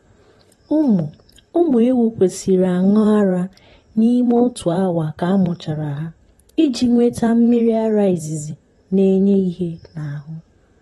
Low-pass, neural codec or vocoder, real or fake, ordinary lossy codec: 19.8 kHz; vocoder, 44.1 kHz, 128 mel bands, Pupu-Vocoder; fake; AAC, 32 kbps